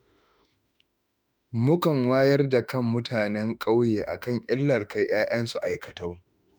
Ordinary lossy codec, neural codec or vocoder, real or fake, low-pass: none; autoencoder, 48 kHz, 32 numbers a frame, DAC-VAE, trained on Japanese speech; fake; none